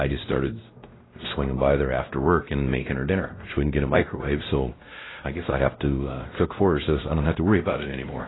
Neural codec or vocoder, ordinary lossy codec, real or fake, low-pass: codec, 16 kHz, 0.5 kbps, X-Codec, WavLM features, trained on Multilingual LibriSpeech; AAC, 16 kbps; fake; 7.2 kHz